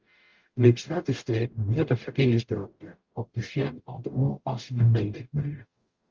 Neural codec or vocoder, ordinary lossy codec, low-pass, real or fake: codec, 44.1 kHz, 0.9 kbps, DAC; Opus, 32 kbps; 7.2 kHz; fake